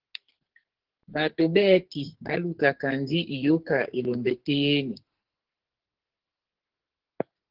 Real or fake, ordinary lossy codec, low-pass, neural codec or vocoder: fake; Opus, 16 kbps; 5.4 kHz; codec, 44.1 kHz, 2.6 kbps, SNAC